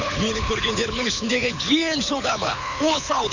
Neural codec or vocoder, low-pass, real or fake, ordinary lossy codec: codec, 24 kHz, 6 kbps, HILCodec; 7.2 kHz; fake; none